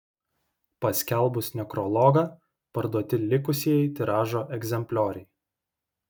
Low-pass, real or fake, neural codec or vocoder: 19.8 kHz; real; none